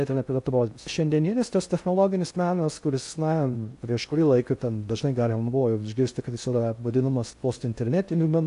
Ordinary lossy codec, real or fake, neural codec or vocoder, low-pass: AAC, 64 kbps; fake; codec, 16 kHz in and 24 kHz out, 0.6 kbps, FocalCodec, streaming, 2048 codes; 10.8 kHz